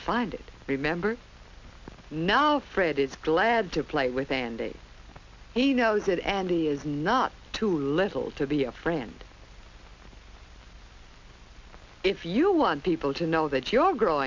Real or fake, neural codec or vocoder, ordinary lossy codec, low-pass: real; none; MP3, 64 kbps; 7.2 kHz